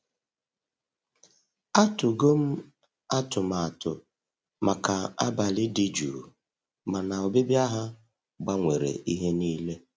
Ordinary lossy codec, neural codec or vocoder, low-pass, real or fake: none; none; none; real